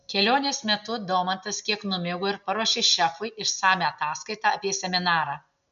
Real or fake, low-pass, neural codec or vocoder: real; 7.2 kHz; none